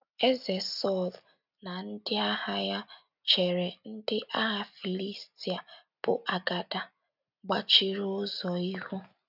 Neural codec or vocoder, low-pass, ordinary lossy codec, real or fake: none; 5.4 kHz; none; real